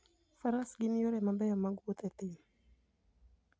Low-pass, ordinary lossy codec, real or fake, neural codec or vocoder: none; none; real; none